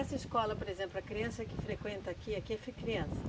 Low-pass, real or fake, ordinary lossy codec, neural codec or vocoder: none; real; none; none